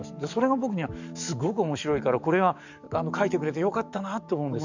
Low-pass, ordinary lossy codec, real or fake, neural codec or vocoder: 7.2 kHz; none; fake; codec, 44.1 kHz, 7.8 kbps, DAC